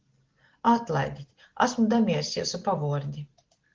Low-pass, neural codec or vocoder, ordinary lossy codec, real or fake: 7.2 kHz; none; Opus, 16 kbps; real